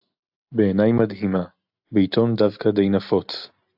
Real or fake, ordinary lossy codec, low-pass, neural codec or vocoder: real; AAC, 48 kbps; 5.4 kHz; none